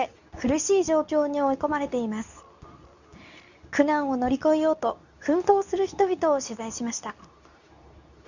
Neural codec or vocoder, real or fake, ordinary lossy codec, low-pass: codec, 24 kHz, 0.9 kbps, WavTokenizer, medium speech release version 2; fake; none; 7.2 kHz